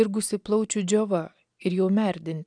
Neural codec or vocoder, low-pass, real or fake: none; 9.9 kHz; real